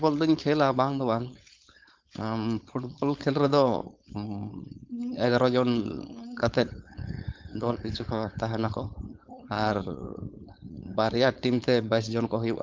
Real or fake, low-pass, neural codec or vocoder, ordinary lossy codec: fake; 7.2 kHz; codec, 16 kHz, 4.8 kbps, FACodec; Opus, 24 kbps